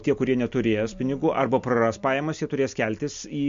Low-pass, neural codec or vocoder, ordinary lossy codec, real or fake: 7.2 kHz; none; MP3, 48 kbps; real